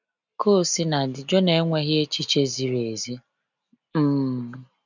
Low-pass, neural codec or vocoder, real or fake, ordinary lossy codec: 7.2 kHz; none; real; none